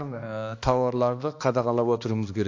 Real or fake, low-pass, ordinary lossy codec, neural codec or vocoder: fake; 7.2 kHz; AAC, 48 kbps; codec, 16 kHz, 2 kbps, X-Codec, HuBERT features, trained on balanced general audio